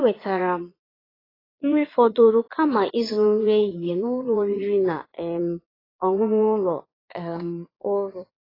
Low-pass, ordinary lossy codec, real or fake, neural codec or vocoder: 5.4 kHz; AAC, 24 kbps; fake; vocoder, 22.05 kHz, 80 mel bands, Vocos